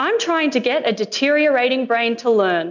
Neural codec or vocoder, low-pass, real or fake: none; 7.2 kHz; real